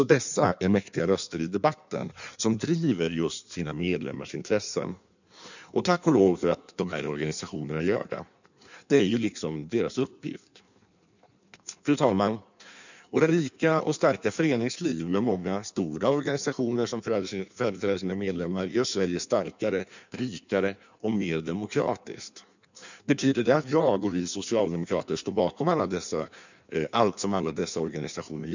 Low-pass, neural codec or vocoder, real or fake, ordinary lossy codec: 7.2 kHz; codec, 16 kHz in and 24 kHz out, 1.1 kbps, FireRedTTS-2 codec; fake; none